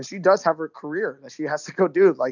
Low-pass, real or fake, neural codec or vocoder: 7.2 kHz; real; none